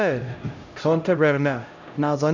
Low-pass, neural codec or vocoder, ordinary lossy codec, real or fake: 7.2 kHz; codec, 16 kHz, 0.5 kbps, X-Codec, HuBERT features, trained on LibriSpeech; MP3, 64 kbps; fake